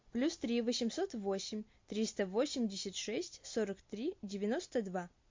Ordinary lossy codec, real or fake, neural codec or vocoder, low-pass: MP3, 48 kbps; real; none; 7.2 kHz